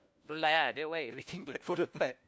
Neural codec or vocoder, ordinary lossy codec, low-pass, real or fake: codec, 16 kHz, 1 kbps, FunCodec, trained on LibriTTS, 50 frames a second; none; none; fake